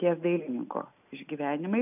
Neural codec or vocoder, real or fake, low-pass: none; real; 3.6 kHz